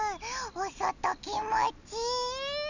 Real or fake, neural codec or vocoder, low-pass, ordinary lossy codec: real; none; 7.2 kHz; none